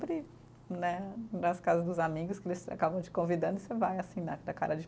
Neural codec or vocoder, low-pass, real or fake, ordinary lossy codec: none; none; real; none